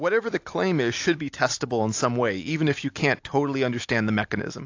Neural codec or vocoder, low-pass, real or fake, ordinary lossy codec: none; 7.2 kHz; real; AAC, 48 kbps